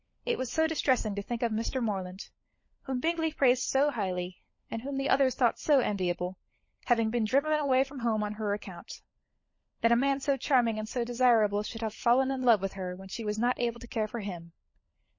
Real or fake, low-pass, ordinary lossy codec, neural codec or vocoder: fake; 7.2 kHz; MP3, 32 kbps; codec, 16 kHz, 16 kbps, FunCodec, trained on LibriTTS, 50 frames a second